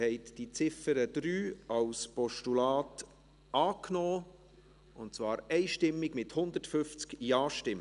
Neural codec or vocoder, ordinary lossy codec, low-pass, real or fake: none; none; 10.8 kHz; real